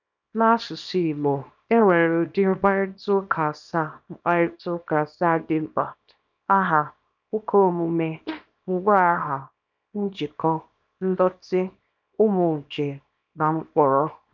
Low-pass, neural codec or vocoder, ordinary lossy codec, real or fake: 7.2 kHz; codec, 24 kHz, 0.9 kbps, WavTokenizer, small release; none; fake